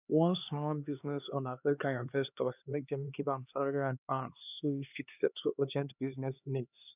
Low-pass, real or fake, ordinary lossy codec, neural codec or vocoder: 3.6 kHz; fake; none; codec, 16 kHz, 2 kbps, X-Codec, HuBERT features, trained on LibriSpeech